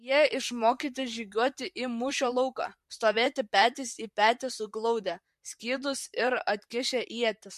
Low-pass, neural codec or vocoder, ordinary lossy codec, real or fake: 14.4 kHz; vocoder, 44.1 kHz, 128 mel bands, Pupu-Vocoder; MP3, 64 kbps; fake